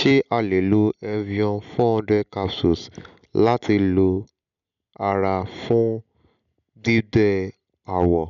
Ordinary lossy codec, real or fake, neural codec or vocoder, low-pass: none; real; none; 7.2 kHz